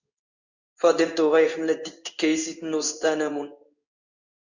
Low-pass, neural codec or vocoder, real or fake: 7.2 kHz; codec, 16 kHz in and 24 kHz out, 1 kbps, XY-Tokenizer; fake